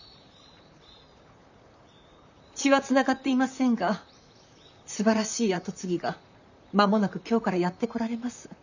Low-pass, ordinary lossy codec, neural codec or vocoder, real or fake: 7.2 kHz; none; vocoder, 44.1 kHz, 128 mel bands, Pupu-Vocoder; fake